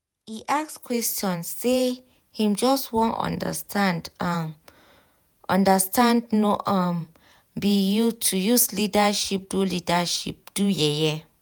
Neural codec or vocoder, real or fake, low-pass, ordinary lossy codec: vocoder, 48 kHz, 128 mel bands, Vocos; fake; none; none